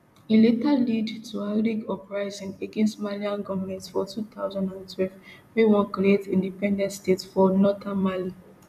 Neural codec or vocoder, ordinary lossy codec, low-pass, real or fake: vocoder, 44.1 kHz, 128 mel bands every 256 samples, BigVGAN v2; none; 14.4 kHz; fake